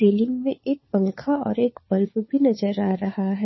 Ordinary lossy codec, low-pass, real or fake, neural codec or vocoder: MP3, 24 kbps; 7.2 kHz; fake; codec, 16 kHz, 4 kbps, FunCodec, trained on LibriTTS, 50 frames a second